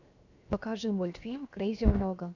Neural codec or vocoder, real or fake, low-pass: codec, 16 kHz, 0.7 kbps, FocalCodec; fake; 7.2 kHz